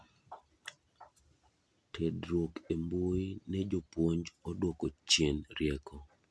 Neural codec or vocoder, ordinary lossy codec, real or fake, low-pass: none; none; real; none